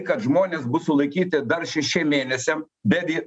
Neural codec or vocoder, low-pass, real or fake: none; 9.9 kHz; real